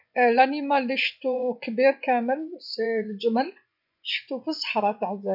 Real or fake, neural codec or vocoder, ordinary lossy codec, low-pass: fake; vocoder, 24 kHz, 100 mel bands, Vocos; none; 5.4 kHz